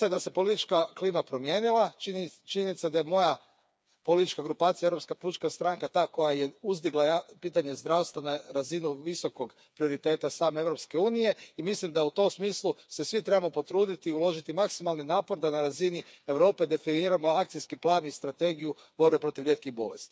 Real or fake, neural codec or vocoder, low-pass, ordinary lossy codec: fake; codec, 16 kHz, 4 kbps, FreqCodec, smaller model; none; none